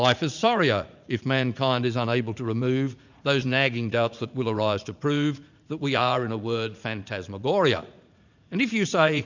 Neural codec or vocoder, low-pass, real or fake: vocoder, 44.1 kHz, 80 mel bands, Vocos; 7.2 kHz; fake